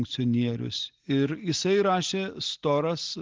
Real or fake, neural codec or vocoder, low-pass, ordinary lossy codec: real; none; 7.2 kHz; Opus, 16 kbps